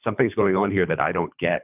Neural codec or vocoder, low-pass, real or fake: codec, 24 kHz, 3 kbps, HILCodec; 3.6 kHz; fake